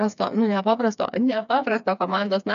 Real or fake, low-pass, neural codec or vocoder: fake; 7.2 kHz; codec, 16 kHz, 4 kbps, FreqCodec, smaller model